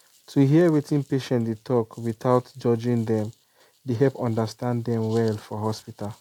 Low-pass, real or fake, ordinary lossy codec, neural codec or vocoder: 19.8 kHz; real; MP3, 96 kbps; none